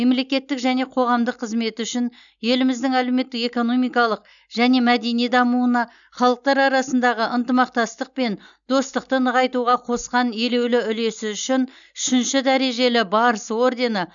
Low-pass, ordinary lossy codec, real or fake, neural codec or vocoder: 7.2 kHz; none; real; none